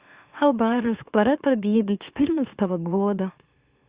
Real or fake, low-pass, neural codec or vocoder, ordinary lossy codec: fake; 3.6 kHz; autoencoder, 44.1 kHz, a latent of 192 numbers a frame, MeloTTS; Opus, 64 kbps